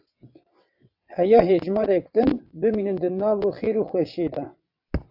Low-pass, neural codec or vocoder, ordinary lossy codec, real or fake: 5.4 kHz; vocoder, 22.05 kHz, 80 mel bands, WaveNeXt; AAC, 48 kbps; fake